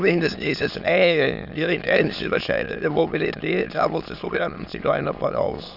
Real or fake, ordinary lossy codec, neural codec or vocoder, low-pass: fake; none; autoencoder, 22.05 kHz, a latent of 192 numbers a frame, VITS, trained on many speakers; 5.4 kHz